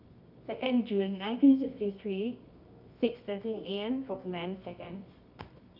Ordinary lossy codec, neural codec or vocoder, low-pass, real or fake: none; codec, 24 kHz, 0.9 kbps, WavTokenizer, medium music audio release; 5.4 kHz; fake